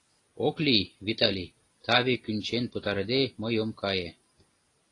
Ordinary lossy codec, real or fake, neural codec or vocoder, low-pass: AAC, 32 kbps; real; none; 10.8 kHz